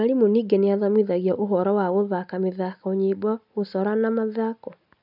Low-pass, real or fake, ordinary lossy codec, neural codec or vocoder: 5.4 kHz; real; none; none